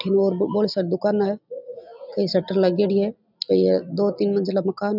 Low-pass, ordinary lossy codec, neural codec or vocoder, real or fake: 5.4 kHz; none; none; real